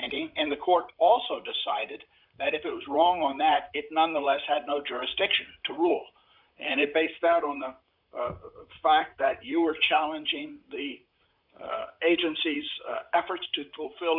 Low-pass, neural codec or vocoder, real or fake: 5.4 kHz; vocoder, 44.1 kHz, 128 mel bands, Pupu-Vocoder; fake